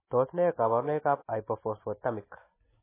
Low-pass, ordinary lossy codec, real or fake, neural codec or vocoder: 3.6 kHz; MP3, 16 kbps; real; none